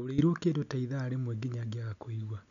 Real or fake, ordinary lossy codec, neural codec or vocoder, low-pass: real; none; none; 7.2 kHz